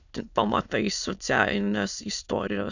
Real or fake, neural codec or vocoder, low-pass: fake; autoencoder, 22.05 kHz, a latent of 192 numbers a frame, VITS, trained on many speakers; 7.2 kHz